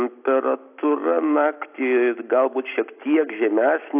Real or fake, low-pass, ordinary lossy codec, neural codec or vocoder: real; 3.6 kHz; AAC, 32 kbps; none